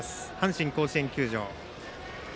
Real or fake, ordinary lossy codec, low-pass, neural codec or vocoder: real; none; none; none